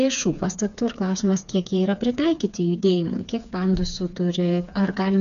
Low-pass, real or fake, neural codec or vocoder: 7.2 kHz; fake; codec, 16 kHz, 4 kbps, FreqCodec, smaller model